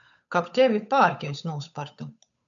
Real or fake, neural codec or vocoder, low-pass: fake; codec, 16 kHz, 8 kbps, FunCodec, trained on Chinese and English, 25 frames a second; 7.2 kHz